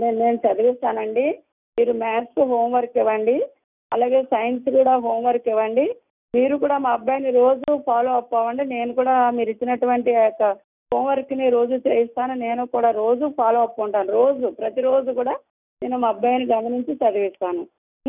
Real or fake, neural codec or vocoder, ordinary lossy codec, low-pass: real; none; none; 3.6 kHz